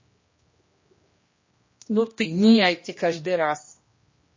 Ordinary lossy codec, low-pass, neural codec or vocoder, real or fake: MP3, 32 kbps; 7.2 kHz; codec, 16 kHz, 1 kbps, X-Codec, HuBERT features, trained on general audio; fake